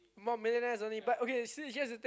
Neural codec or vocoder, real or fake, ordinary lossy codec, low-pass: none; real; none; none